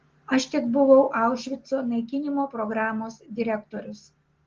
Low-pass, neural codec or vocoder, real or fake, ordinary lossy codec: 7.2 kHz; none; real; Opus, 16 kbps